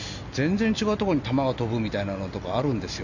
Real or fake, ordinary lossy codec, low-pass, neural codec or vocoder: real; none; 7.2 kHz; none